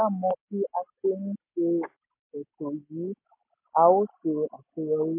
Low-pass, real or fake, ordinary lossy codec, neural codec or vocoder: 3.6 kHz; real; MP3, 24 kbps; none